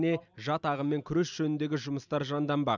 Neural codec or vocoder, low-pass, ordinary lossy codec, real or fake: none; 7.2 kHz; none; real